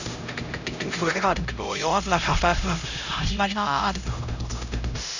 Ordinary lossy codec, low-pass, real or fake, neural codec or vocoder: none; 7.2 kHz; fake; codec, 16 kHz, 0.5 kbps, X-Codec, HuBERT features, trained on LibriSpeech